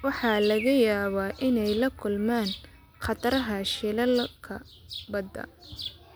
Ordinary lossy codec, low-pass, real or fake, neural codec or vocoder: none; none; real; none